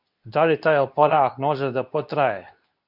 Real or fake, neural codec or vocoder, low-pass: fake; codec, 24 kHz, 0.9 kbps, WavTokenizer, medium speech release version 2; 5.4 kHz